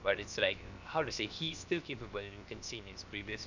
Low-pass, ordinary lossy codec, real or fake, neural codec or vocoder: 7.2 kHz; none; fake; codec, 16 kHz, 0.7 kbps, FocalCodec